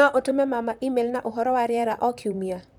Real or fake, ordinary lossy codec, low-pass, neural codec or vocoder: fake; none; 19.8 kHz; vocoder, 44.1 kHz, 128 mel bands, Pupu-Vocoder